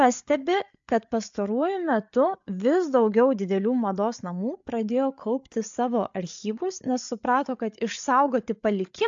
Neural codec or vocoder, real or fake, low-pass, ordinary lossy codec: codec, 16 kHz, 8 kbps, FreqCodec, larger model; fake; 7.2 kHz; AAC, 64 kbps